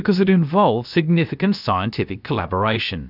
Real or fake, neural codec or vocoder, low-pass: fake; codec, 16 kHz, about 1 kbps, DyCAST, with the encoder's durations; 5.4 kHz